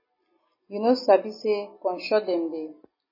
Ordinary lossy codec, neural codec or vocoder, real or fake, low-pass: MP3, 24 kbps; none; real; 5.4 kHz